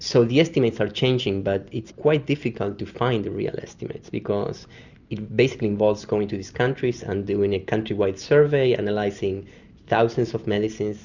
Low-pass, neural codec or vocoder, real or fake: 7.2 kHz; none; real